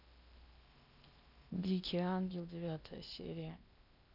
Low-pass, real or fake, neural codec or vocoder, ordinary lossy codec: 5.4 kHz; fake; codec, 16 kHz in and 24 kHz out, 0.8 kbps, FocalCodec, streaming, 65536 codes; none